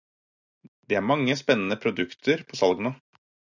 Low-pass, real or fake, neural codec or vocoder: 7.2 kHz; real; none